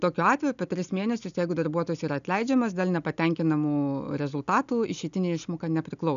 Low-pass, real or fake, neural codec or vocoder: 7.2 kHz; real; none